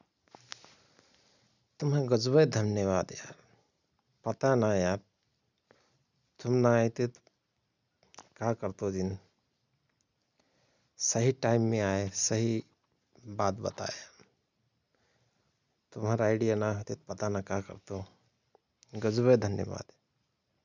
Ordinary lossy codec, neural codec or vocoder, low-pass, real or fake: none; none; 7.2 kHz; real